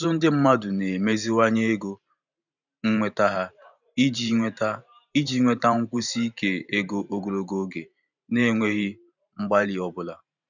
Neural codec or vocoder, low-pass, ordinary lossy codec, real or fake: vocoder, 44.1 kHz, 128 mel bands every 512 samples, BigVGAN v2; 7.2 kHz; none; fake